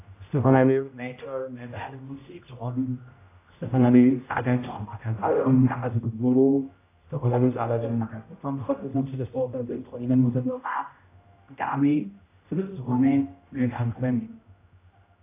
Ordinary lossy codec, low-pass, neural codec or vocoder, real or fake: AAC, 24 kbps; 3.6 kHz; codec, 16 kHz, 0.5 kbps, X-Codec, HuBERT features, trained on general audio; fake